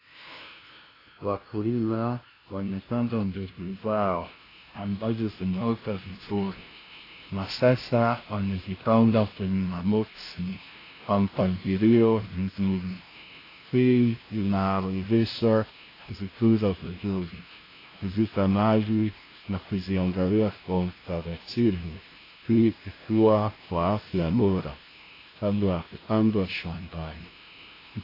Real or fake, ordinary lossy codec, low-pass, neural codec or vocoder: fake; AAC, 24 kbps; 5.4 kHz; codec, 16 kHz, 0.5 kbps, FunCodec, trained on LibriTTS, 25 frames a second